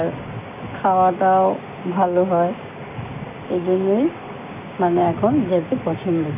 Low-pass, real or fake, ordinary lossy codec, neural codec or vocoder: 3.6 kHz; fake; none; codec, 16 kHz, 6 kbps, DAC